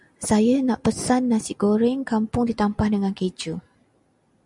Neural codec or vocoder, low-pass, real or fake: none; 10.8 kHz; real